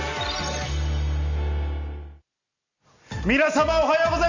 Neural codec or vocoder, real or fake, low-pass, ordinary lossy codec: none; real; 7.2 kHz; none